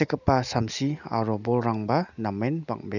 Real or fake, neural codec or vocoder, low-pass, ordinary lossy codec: real; none; 7.2 kHz; none